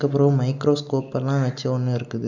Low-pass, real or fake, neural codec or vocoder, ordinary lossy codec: 7.2 kHz; real; none; none